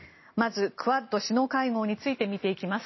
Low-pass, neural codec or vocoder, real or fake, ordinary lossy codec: 7.2 kHz; none; real; MP3, 24 kbps